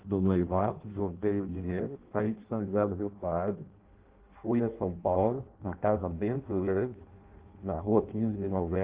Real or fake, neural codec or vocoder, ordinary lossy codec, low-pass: fake; codec, 16 kHz in and 24 kHz out, 0.6 kbps, FireRedTTS-2 codec; Opus, 16 kbps; 3.6 kHz